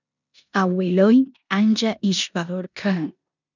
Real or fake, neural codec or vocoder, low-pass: fake; codec, 16 kHz in and 24 kHz out, 0.9 kbps, LongCat-Audio-Codec, four codebook decoder; 7.2 kHz